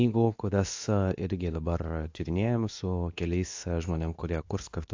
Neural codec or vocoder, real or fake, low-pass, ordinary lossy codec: codec, 24 kHz, 0.9 kbps, WavTokenizer, medium speech release version 2; fake; 7.2 kHz; AAC, 48 kbps